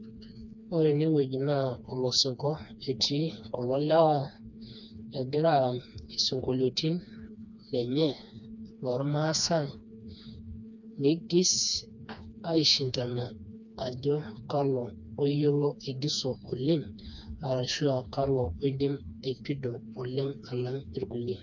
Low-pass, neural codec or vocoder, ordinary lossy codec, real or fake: 7.2 kHz; codec, 16 kHz, 2 kbps, FreqCodec, smaller model; none; fake